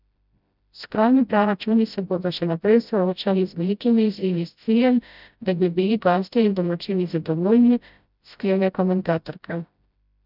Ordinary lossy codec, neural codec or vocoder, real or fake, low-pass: none; codec, 16 kHz, 0.5 kbps, FreqCodec, smaller model; fake; 5.4 kHz